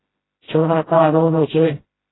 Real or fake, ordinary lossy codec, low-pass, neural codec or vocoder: fake; AAC, 16 kbps; 7.2 kHz; codec, 16 kHz, 0.5 kbps, FreqCodec, smaller model